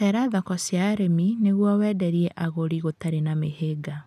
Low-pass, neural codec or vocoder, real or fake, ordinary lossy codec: 14.4 kHz; none; real; none